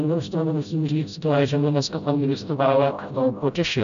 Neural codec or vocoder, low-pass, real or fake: codec, 16 kHz, 0.5 kbps, FreqCodec, smaller model; 7.2 kHz; fake